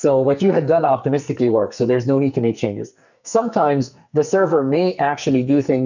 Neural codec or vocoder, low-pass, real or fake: codec, 44.1 kHz, 2.6 kbps, SNAC; 7.2 kHz; fake